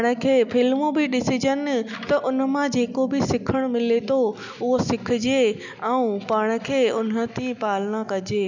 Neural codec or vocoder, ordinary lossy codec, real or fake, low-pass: none; none; real; 7.2 kHz